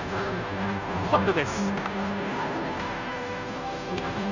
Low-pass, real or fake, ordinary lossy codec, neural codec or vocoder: 7.2 kHz; fake; none; codec, 16 kHz, 0.5 kbps, FunCodec, trained on Chinese and English, 25 frames a second